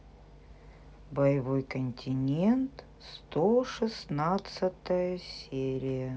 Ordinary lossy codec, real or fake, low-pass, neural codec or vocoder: none; real; none; none